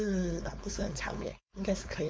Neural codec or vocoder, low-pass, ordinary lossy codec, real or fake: codec, 16 kHz, 4.8 kbps, FACodec; none; none; fake